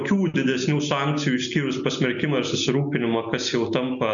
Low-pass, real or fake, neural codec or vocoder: 7.2 kHz; real; none